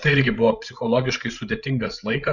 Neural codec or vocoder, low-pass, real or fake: codec, 16 kHz, 16 kbps, FreqCodec, larger model; 7.2 kHz; fake